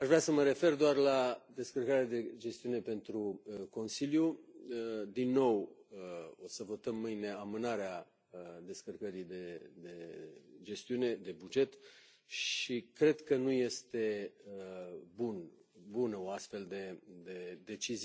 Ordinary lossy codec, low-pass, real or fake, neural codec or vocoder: none; none; real; none